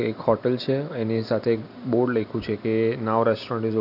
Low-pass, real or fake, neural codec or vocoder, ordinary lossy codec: 5.4 kHz; real; none; AAC, 48 kbps